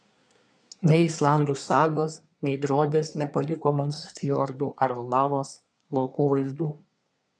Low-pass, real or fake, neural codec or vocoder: 9.9 kHz; fake; codec, 24 kHz, 1 kbps, SNAC